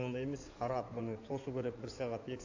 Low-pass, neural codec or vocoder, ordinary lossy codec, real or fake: 7.2 kHz; codec, 16 kHz in and 24 kHz out, 2.2 kbps, FireRedTTS-2 codec; AAC, 48 kbps; fake